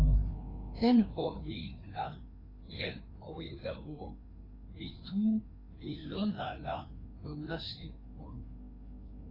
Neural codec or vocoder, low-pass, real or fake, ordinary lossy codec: codec, 16 kHz, 2 kbps, FreqCodec, larger model; 5.4 kHz; fake; AAC, 24 kbps